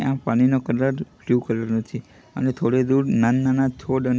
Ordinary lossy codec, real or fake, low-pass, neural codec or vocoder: none; real; none; none